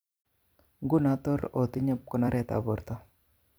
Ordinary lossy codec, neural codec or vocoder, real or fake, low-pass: none; vocoder, 44.1 kHz, 128 mel bands every 256 samples, BigVGAN v2; fake; none